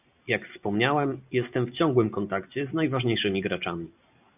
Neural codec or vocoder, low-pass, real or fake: none; 3.6 kHz; real